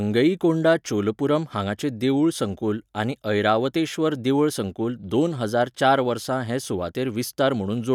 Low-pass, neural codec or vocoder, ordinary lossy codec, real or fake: 19.8 kHz; none; none; real